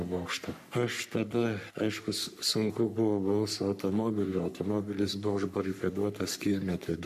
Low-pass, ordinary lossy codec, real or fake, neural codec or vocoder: 14.4 kHz; MP3, 96 kbps; fake; codec, 44.1 kHz, 3.4 kbps, Pupu-Codec